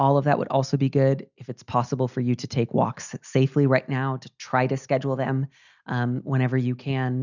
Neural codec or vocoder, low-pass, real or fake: none; 7.2 kHz; real